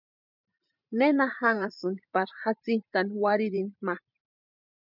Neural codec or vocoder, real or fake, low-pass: vocoder, 44.1 kHz, 128 mel bands every 512 samples, BigVGAN v2; fake; 5.4 kHz